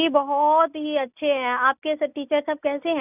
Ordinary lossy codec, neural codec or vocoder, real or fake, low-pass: none; none; real; 3.6 kHz